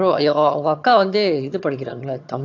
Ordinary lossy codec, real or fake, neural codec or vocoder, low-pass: AAC, 48 kbps; fake; vocoder, 22.05 kHz, 80 mel bands, HiFi-GAN; 7.2 kHz